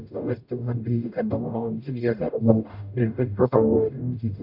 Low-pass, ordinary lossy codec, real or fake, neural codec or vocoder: 5.4 kHz; none; fake; codec, 44.1 kHz, 0.9 kbps, DAC